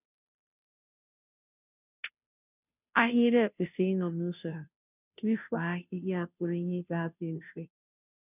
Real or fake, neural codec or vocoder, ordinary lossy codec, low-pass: fake; codec, 16 kHz, 0.5 kbps, FunCodec, trained on Chinese and English, 25 frames a second; none; 3.6 kHz